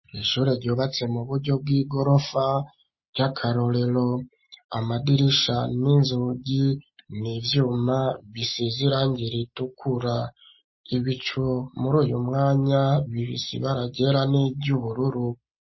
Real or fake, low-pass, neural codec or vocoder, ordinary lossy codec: real; 7.2 kHz; none; MP3, 24 kbps